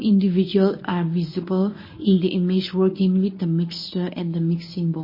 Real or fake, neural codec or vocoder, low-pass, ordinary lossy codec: fake; codec, 24 kHz, 0.9 kbps, WavTokenizer, medium speech release version 1; 5.4 kHz; MP3, 24 kbps